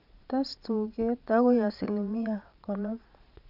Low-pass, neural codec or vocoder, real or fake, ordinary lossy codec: 5.4 kHz; vocoder, 22.05 kHz, 80 mel bands, Vocos; fake; none